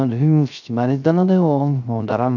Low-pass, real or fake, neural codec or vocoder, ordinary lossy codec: 7.2 kHz; fake; codec, 16 kHz, 0.3 kbps, FocalCodec; none